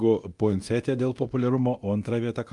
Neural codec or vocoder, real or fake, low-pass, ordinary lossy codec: codec, 24 kHz, 0.9 kbps, DualCodec; fake; 10.8 kHz; Opus, 32 kbps